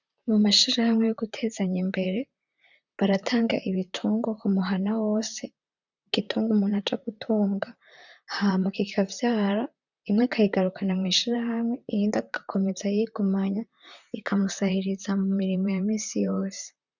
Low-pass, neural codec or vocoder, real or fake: 7.2 kHz; vocoder, 44.1 kHz, 128 mel bands, Pupu-Vocoder; fake